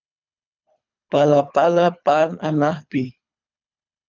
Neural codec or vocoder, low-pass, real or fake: codec, 24 kHz, 3 kbps, HILCodec; 7.2 kHz; fake